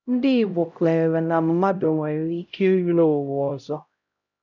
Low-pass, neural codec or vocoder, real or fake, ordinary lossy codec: 7.2 kHz; codec, 16 kHz, 0.5 kbps, X-Codec, HuBERT features, trained on LibriSpeech; fake; none